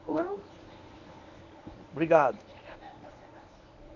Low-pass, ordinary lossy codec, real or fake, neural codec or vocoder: 7.2 kHz; none; fake; codec, 24 kHz, 0.9 kbps, WavTokenizer, medium speech release version 1